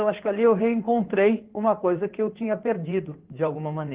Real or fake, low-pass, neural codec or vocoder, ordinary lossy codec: fake; 3.6 kHz; codec, 16 kHz in and 24 kHz out, 2.2 kbps, FireRedTTS-2 codec; Opus, 16 kbps